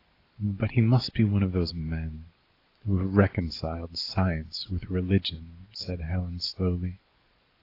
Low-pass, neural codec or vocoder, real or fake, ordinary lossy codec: 5.4 kHz; none; real; AAC, 32 kbps